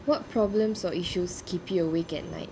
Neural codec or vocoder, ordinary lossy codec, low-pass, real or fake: none; none; none; real